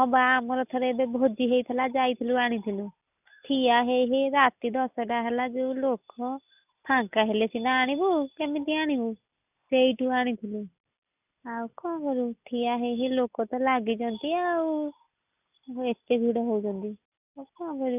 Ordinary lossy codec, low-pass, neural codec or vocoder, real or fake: none; 3.6 kHz; none; real